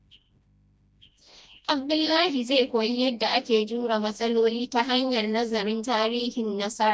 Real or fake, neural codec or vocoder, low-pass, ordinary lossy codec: fake; codec, 16 kHz, 1 kbps, FreqCodec, smaller model; none; none